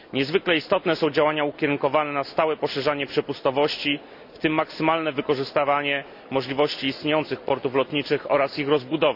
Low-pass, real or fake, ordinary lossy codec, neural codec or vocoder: 5.4 kHz; real; none; none